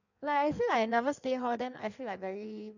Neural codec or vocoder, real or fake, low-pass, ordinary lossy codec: codec, 16 kHz in and 24 kHz out, 1.1 kbps, FireRedTTS-2 codec; fake; 7.2 kHz; none